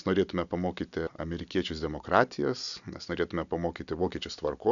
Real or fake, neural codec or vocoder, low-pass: real; none; 7.2 kHz